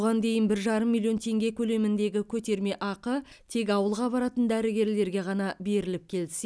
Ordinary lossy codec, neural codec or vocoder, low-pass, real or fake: none; none; none; real